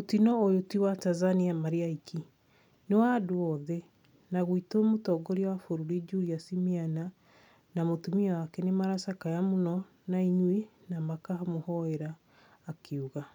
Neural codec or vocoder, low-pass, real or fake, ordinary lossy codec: none; 19.8 kHz; real; none